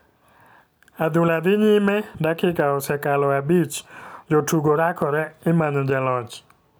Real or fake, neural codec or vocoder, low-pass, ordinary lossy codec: real; none; none; none